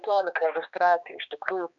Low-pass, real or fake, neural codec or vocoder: 7.2 kHz; fake; codec, 16 kHz, 2 kbps, X-Codec, HuBERT features, trained on balanced general audio